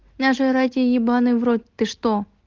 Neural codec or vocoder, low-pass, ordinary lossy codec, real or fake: none; 7.2 kHz; Opus, 16 kbps; real